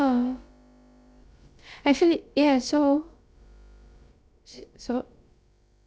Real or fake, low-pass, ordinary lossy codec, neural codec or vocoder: fake; none; none; codec, 16 kHz, about 1 kbps, DyCAST, with the encoder's durations